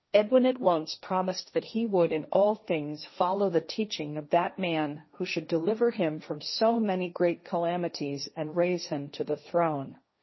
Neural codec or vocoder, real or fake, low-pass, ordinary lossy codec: codec, 16 kHz, 1.1 kbps, Voila-Tokenizer; fake; 7.2 kHz; MP3, 24 kbps